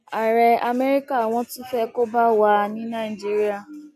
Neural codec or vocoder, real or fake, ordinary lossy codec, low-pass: none; real; none; 14.4 kHz